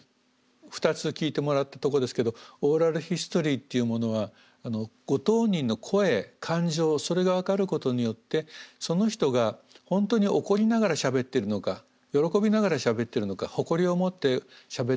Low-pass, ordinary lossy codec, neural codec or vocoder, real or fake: none; none; none; real